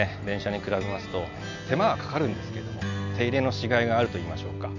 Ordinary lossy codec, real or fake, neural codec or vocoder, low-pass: none; real; none; 7.2 kHz